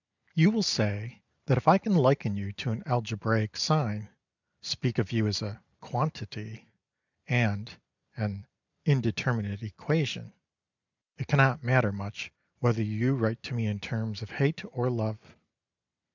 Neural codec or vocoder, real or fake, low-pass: none; real; 7.2 kHz